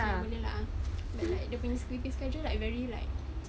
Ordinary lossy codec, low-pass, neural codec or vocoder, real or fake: none; none; none; real